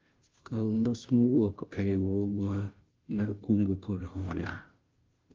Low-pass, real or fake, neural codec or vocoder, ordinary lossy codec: 7.2 kHz; fake; codec, 16 kHz, 0.5 kbps, FunCodec, trained on Chinese and English, 25 frames a second; Opus, 24 kbps